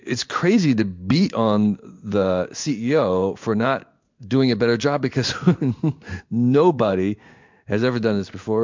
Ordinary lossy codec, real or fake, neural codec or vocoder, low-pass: MP3, 64 kbps; fake; codec, 16 kHz in and 24 kHz out, 1 kbps, XY-Tokenizer; 7.2 kHz